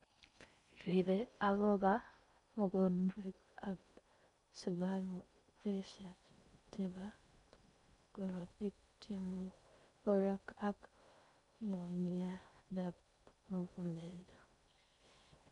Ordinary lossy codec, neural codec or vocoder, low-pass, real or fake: none; codec, 16 kHz in and 24 kHz out, 0.6 kbps, FocalCodec, streaming, 4096 codes; 10.8 kHz; fake